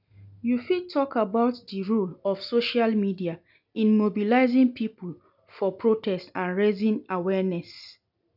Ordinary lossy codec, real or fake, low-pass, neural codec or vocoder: none; real; 5.4 kHz; none